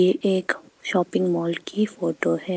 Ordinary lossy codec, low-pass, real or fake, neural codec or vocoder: none; none; real; none